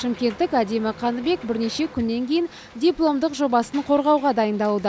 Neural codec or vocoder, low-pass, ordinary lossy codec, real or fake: none; none; none; real